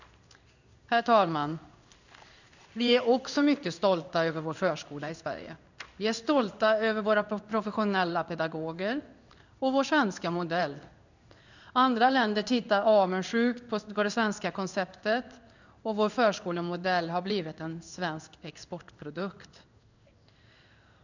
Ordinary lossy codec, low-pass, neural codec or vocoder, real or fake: none; 7.2 kHz; codec, 16 kHz in and 24 kHz out, 1 kbps, XY-Tokenizer; fake